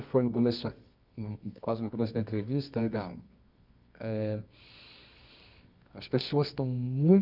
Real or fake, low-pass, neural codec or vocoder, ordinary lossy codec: fake; 5.4 kHz; codec, 24 kHz, 0.9 kbps, WavTokenizer, medium music audio release; none